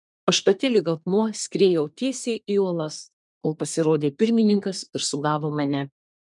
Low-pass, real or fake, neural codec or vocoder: 10.8 kHz; fake; codec, 24 kHz, 1 kbps, SNAC